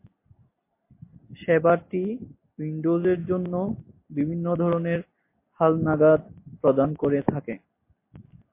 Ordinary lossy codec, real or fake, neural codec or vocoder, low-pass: MP3, 24 kbps; real; none; 3.6 kHz